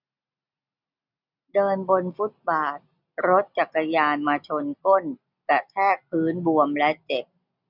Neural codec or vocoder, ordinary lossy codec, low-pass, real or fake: none; none; 5.4 kHz; real